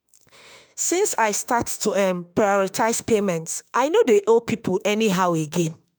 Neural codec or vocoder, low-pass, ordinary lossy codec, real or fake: autoencoder, 48 kHz, 32 numbers a frame, DAC-VAE, trained on Japanese speech; none; none; fake